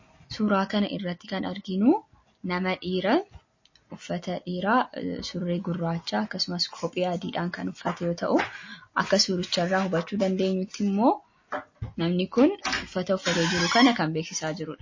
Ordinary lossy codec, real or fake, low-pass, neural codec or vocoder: MP3, 32 kbps; real; 7.2 kHz; none